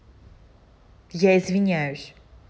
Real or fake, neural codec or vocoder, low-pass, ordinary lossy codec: real; none; none; none